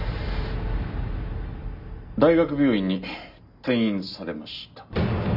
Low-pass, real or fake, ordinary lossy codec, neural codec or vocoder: 5.4 kHz; real; none; none